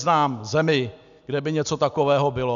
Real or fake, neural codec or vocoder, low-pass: real; none; 7.2 kHz